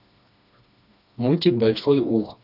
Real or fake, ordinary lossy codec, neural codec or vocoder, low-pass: fake; none; codec, 16 kHz, 2 kbps, FreqCodec, smaller model; 5.4 kHz